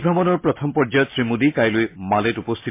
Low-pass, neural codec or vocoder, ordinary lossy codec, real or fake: 3.6 kHz; none; MP3, 24 kbps; real